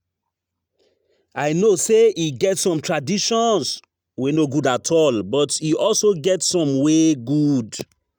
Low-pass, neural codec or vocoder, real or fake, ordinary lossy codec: none; none; real; none